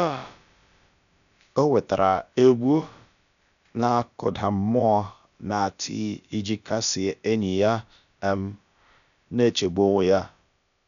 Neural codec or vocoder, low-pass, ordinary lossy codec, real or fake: codec, 16 kHz, about 1 kbps, DyCAST, with the encoder's durations; 7.2 kHz; none; fake